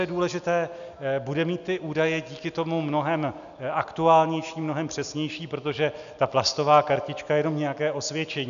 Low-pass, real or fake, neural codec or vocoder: 7.2 kHz; real; none